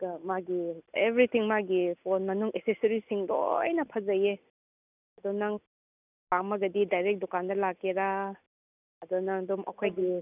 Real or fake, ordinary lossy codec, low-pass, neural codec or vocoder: real; none; 3.6 kHz; none